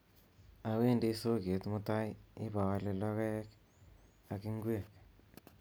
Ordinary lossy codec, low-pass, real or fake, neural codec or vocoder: none; none; real; none